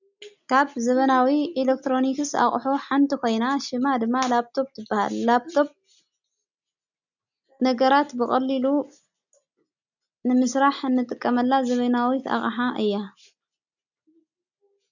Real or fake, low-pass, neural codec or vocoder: real; 7.2 kHz; none